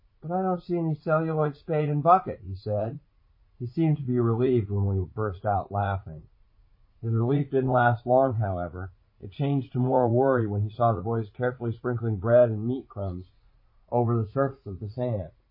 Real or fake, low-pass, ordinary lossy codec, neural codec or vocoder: fake; 5.4 kHz; MP3, 24 kbps; vocoder, 44.1 kHz, 80 mel bands, Vocos